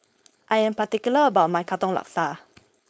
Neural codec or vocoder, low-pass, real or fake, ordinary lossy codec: codec, 16 kHz, 4.8 kbps, FACodec; none; fake; none